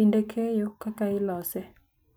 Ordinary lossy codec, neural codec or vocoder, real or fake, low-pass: none; none; real; none